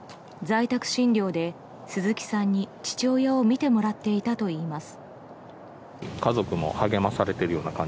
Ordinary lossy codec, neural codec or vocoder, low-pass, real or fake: none; none; none; real